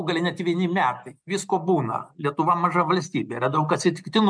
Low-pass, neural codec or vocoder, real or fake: 9.9 kHz; none; real